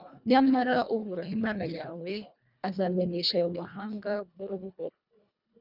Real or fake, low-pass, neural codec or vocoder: fake; 5.4 kHz; codec, 24 kHz, 1.5 kbps, HILCodec